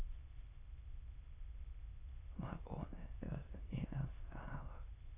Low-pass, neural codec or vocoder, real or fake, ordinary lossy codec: 3.6 kHz; autoencoder, 22.05 kHz, a latent of 192 numbers a frame, VITS, trained on many speakers; fake; AAC, 16 kbps